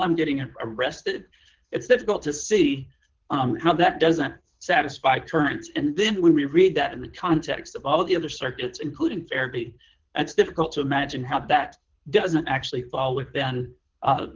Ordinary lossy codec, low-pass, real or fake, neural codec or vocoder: Opus, 16 kbps; 7.2 kHz; fake; codec, 24 kHz, 6 kbps, HILCodec